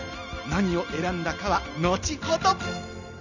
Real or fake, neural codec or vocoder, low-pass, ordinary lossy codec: real; none; 7.2 kHz; none